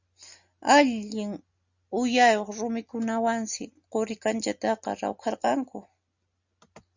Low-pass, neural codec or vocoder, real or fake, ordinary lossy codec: 7.2 kHz; none; real; Opus, 64 kbps